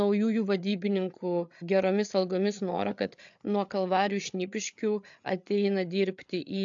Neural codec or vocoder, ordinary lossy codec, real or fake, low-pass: codec, 16 kHz, 8 kbps, FreqCodec, larger model; AAC, 64 kbps; fake; 7.2 kHz